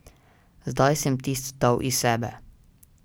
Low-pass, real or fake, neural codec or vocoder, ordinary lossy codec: none; real; none; none